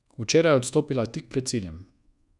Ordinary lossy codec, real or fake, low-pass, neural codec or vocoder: none; fake; 10.8 kHz; codec, 24 kHz, 1.2 kbps, DualCodec